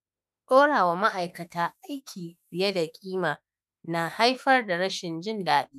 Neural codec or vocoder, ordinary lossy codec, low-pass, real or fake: autoencoder, 48 kHz, 32 numbers a frame, DAC-VAE, trained on Japanese speech; none; 14.4 kHz; fake